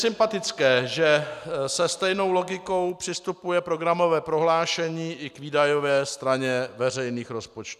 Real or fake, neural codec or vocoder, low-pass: real; none; 14.4 kHz